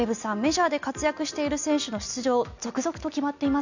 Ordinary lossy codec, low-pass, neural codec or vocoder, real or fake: none; 7.2 kHz; none; real